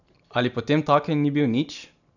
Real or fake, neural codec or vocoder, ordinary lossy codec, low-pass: real; none; none; 7.2 kHz